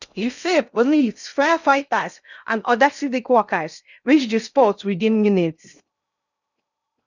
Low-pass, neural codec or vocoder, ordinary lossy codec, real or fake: 7.2 kHz; codec, 16 kHz in and 24 kHz out, 0.6 kbps, FocalCodec, streaming, 2048 codes; none; fake